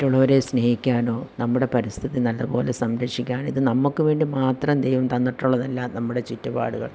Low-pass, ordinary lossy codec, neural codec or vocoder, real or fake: none; none; none; real